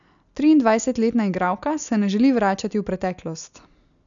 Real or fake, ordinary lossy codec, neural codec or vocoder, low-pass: real; none; none; 7.2 kHz